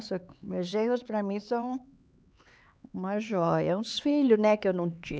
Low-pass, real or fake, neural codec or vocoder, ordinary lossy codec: none; fake; codec, 16 kHz, 4 kbps, X-Codec, HuBERT features, trained on LibriSpeech; none